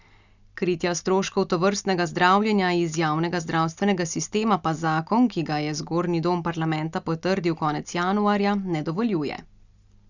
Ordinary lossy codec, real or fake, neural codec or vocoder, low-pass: none; real; none; 7.2 kHz